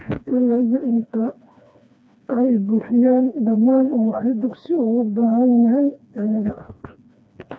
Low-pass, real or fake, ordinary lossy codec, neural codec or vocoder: none; fake; none; codec, 16 kHz, 2 kbps, FreqCodec, smaller model